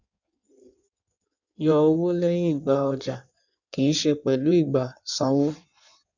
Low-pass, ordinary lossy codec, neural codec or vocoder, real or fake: 7.2 kHz; none; codec, 16 kHz in and 24 kHz out, 1.1 kbps, FireRedTTS-2 codec; fake